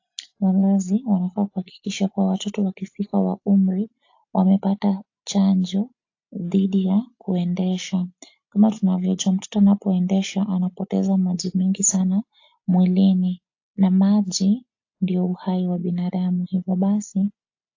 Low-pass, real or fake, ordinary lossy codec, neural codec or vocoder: 7.2 kHz; real; AAC, 48 kbps; none